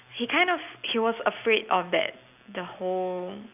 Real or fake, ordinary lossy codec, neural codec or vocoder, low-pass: real; none; none; 3.6 kHz